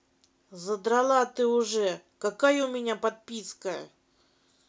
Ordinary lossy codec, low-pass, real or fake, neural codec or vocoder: none; none; real; none